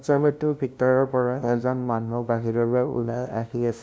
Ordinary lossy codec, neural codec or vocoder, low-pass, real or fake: none; codec, 16 kHz, 0.5 kbps, FunCodec, trained on LibriTTS, 25 frames a second; none; fake